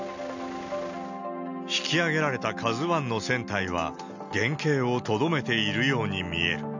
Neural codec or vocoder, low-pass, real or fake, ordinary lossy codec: none; 7.2 kHz; real; none